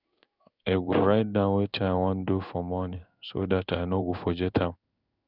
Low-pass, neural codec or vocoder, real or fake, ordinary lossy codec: 5.4 kHz; codec, 16 kHz in and 24 kHz out, 1 kbps, XY-Tokenizer; fake; none